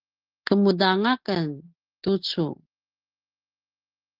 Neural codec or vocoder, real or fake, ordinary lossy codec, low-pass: none; real; Opus, 16 kbps; 5.4 kHz